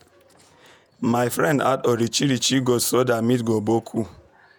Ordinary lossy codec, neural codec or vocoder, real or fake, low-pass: none; vocoder, 48 kHz, 128 mel bands, Vocos; fake; none